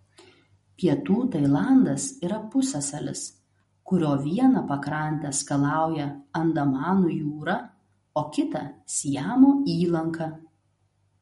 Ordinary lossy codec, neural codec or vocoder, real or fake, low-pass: MP3, 48 kbps; none; real; 19.8 kHz